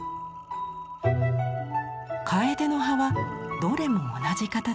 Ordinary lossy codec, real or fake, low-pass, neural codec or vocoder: none; real; none; none